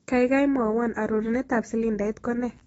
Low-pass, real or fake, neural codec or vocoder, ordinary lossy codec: 19.8 kHz; fake; autoencoder, 48 kHz, 128 numbers a frame, DAC-VAE, trained on Japanese speech; AAC, 24 kbps